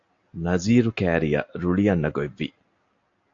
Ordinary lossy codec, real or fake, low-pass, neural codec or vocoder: AAC, 48 kbps; real; 7.2 kHz; none